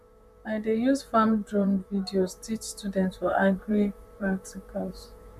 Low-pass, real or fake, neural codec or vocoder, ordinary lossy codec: 14.4 kHz; fake; vocoder, 44.1 kHz, 128 mel bands, Pupu-Vocoder; none